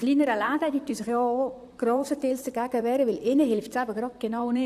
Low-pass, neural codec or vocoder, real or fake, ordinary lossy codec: 14.4 kHz; vocoder, 44.1 kHz, 128 mel bands, Pupu-Vocoder; fake; none